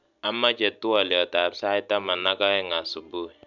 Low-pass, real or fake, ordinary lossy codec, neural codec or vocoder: 7.2 kHz; real; none; none